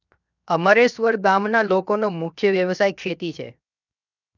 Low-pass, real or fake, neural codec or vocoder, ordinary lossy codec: 7.2 kHz; fake; codec, 16 kHz, 0.7 kbps, FocalCodec; none